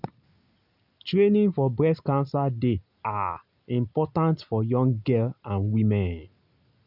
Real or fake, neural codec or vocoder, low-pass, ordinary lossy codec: real; none; 5.4 kHz; none